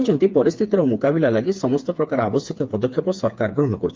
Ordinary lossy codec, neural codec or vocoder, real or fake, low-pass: Opus, 32 kbps; codec, 16 kHz, 4 kbps, FreqCodec, smaller model; fake; 7.2 kHz